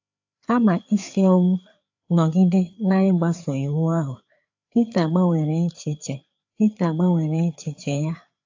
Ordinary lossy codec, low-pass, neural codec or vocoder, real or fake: AAC, 48 kbps; 7.2 kHz; codec, 16 kHz, 4 kbps, FreqCodec, larger model; fake